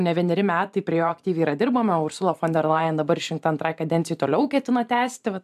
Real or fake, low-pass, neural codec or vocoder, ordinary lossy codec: fake; 14.4 kHz; vocoder, 44.1 kHz, 128 mel bands every 512 samples, BigVGAN v2; AAC, 96 kbps